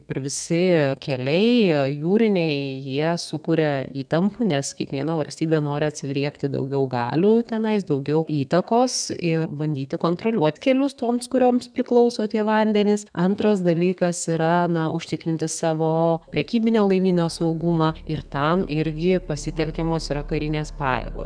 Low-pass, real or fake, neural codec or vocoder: 9.9 kHz; fake; codec, 32 kHz, 1.9 kbps, SNAC